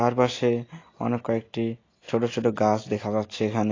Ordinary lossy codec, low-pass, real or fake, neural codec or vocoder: AAC, 32 kbps; 7.2 kHz; real; none